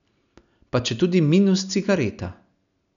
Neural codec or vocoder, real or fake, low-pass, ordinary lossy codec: none; real; 7.2 kHz; none